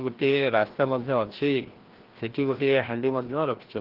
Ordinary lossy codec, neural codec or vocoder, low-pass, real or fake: Opus, 16 kbps; codec, 16 kHz, 1 kbps, FreqCodec, larger model; 5.4 kHz; fake